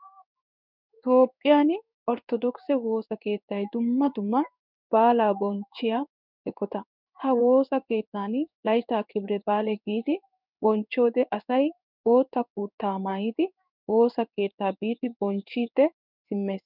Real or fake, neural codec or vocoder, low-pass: fake; codec, 16 kHz in and 24 kHz out, 1 kbps, XY-Tokenizer; 5.4 kHz